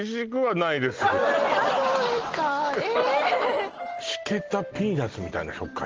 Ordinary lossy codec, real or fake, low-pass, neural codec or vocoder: Opus, 16 kbps; real; 7.2 kHz; none